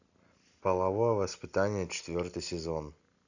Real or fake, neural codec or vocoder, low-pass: real; none; 7.2 kHz